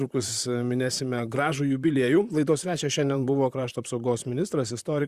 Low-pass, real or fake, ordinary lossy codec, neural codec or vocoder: 14.4 kHz; fake; Opus, 64 kbps; vocoder, 44.1 kHz, 128 mel bands, Pupu-Vocoder